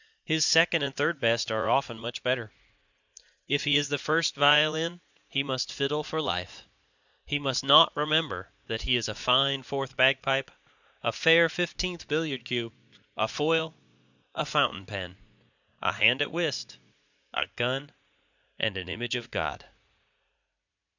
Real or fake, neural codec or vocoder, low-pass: fake; vocoder, 44.1 kHz, 80 mel bands, Vocos; 7.2 kHz